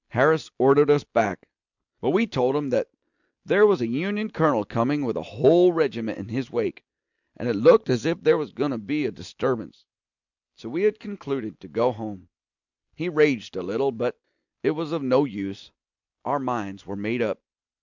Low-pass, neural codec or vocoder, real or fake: 7.2 kHz; none; real